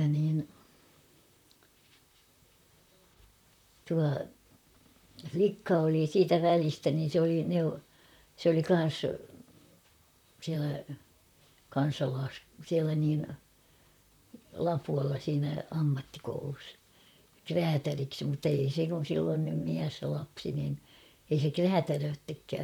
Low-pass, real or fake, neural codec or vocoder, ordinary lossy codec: 19.8 kHz; fake; vocoder, 44.1 kHz, 128 mel bands, Pupu-Vocoder; none